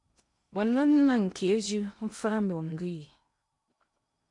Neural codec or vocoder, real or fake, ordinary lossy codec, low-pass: codec, 16 kHz in and 24 kHz out, 0.6 kbps, FocalCodec, streaming, 2048 codes; fake; MP3, 64 kbps; 10.8 kHz